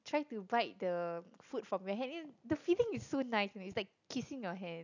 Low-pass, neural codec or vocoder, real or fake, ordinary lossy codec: 7.2 kHz; none; real; none